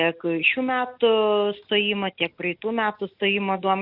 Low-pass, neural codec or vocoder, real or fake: 5.4 kHz; none; real